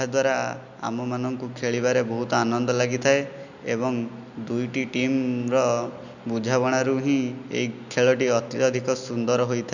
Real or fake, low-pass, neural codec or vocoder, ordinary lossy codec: real; 7.2 kHz; none; none